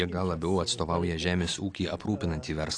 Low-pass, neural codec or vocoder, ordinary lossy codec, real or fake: 9.9 kHz; none; AAC, 48 kbps; real